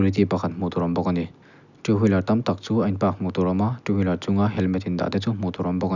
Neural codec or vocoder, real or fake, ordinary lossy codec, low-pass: none; real; none; 7.2 kHz